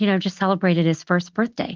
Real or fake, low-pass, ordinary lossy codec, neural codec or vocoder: real; 7.2 kHz; Opus, 32 kbps; none